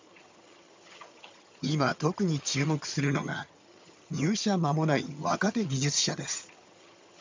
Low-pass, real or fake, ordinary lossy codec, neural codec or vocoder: 7.2 kHz; fake; MP3, 64 kbps; vocoder, 22.05 kHz, 80 mel bands, HiFi-GAN